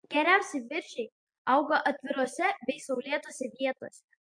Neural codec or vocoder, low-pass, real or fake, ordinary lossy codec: none; 9.9 kHz; real; MP3, 48 kbps